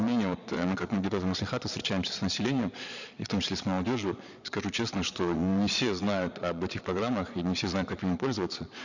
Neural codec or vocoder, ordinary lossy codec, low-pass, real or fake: vocoder, 22.05 kHz, 80 mel bands, Vocos; none; 7.2 kHz; fake